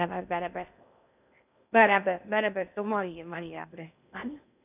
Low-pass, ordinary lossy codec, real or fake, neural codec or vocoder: 3.6 kHz; none; fake; codec, 16 kHz in and 24 kHz out, 0.6 kbps, FocalCodec, streaming, 2048 codes